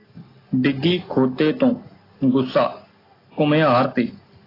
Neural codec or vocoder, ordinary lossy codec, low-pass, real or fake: none; AAC, 24 kbps; 5.4 kHz; real